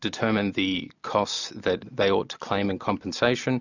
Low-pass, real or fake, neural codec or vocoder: 7.2 kHz; real; none